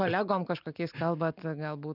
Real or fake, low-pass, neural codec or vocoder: real; 5.4 kHz; none